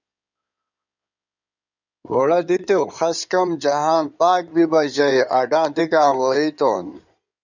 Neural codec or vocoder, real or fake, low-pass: codec, 16 kHz in and 24 kHz out, 2.2 kbps, FireRedTTS-2 codec; fake; 7.2 kHz